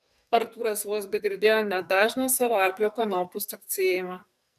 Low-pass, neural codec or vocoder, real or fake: 14.4 kHz; codec, 44.1 kHz, 2.6 kbps, SNAC; fake